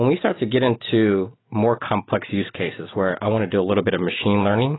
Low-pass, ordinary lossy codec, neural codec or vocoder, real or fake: 7.2 kHz; AAC, 16 kbps; none; real